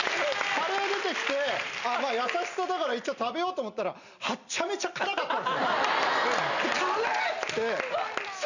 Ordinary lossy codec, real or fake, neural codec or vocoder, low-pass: none; real; none; 7.2 kHz